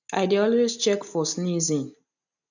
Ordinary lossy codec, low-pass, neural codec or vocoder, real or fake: none; 7.2 kHz; none; real